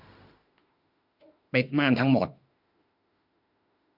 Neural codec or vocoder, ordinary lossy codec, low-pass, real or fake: autoencoder, 48 kHz, 32 numbers a frame, DAC-VAE, trained on Japanese speech; none; 5.4 kHz; fake